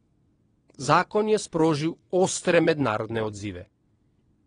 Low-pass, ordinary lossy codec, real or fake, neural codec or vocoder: 9.9 kHz; AAC, 32 kbps; fake; vocoder, 22.05 kHz, 80 mel bands, WaveNeXt